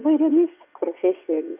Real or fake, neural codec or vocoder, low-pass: fake; vocoder, 22.05 kHz, 80 mel bands, WaveNeXt; 3.6 kHz